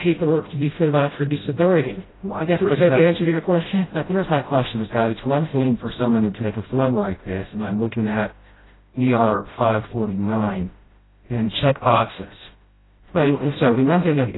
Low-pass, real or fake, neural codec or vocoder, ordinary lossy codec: 7.2 kHz; fake; codec, 16 kHz, 0.5 kbps, FreqCodec, smaller model; AAC, 16 kbps